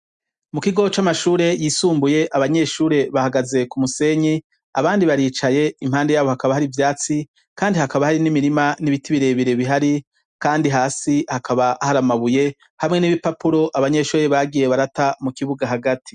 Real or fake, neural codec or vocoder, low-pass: real; none; 9.9 kHz